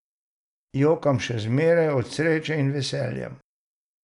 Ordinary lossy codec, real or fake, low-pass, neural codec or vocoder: none; real; 10.8 kHz; none